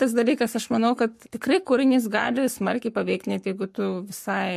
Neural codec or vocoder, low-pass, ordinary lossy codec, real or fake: codec, 44.1 kHz, 7.8 kbps, Pupu-Codec; 14.4 kHz; MP3, 64 kbps; fake